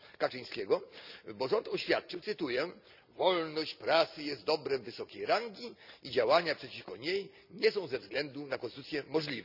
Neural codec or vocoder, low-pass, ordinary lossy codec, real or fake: none; 5.4 kHz; none; real